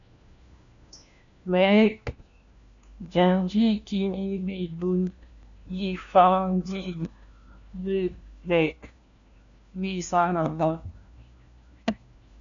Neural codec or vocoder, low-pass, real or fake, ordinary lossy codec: codec, 16 kHz, 1 kbps, FunCodec, trained on LibriTTS, 50 frames a second; 7.2 kHz; fake; MP3, 96 kbps